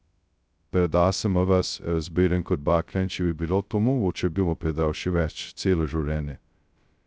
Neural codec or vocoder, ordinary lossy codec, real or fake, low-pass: codec, 16 kHz, 0.2 kbps, FocalCodec; none; fake; none